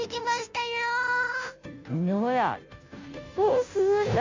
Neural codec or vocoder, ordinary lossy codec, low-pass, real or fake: codec, 16 kHz, 0.5 kbps, FunCodec, trained on Chinese and English, 25 frames a second; MP3, 64 kbps; 7.2 kHz; fake